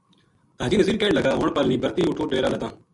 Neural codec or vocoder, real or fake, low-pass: none; real; 10.8 kHz